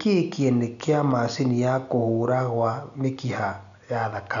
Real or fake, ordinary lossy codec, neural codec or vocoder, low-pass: real; none; none; 7.2 kHz